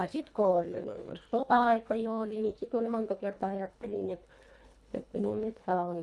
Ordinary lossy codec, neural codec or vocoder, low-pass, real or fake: none; codec, 24 kHz, 1.5 kbps, HILCodec; none; fake